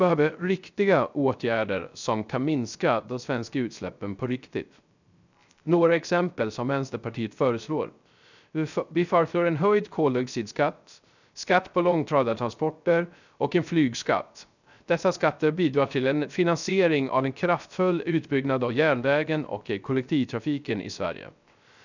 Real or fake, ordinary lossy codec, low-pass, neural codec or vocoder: fake; none; 7.2 kHz; codec, 16 kHz, 0.3 kbps, FocalCodec